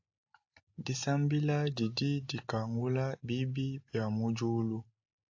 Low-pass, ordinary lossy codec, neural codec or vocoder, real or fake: 7.2 kHz; AAC, 48 kbps; none; real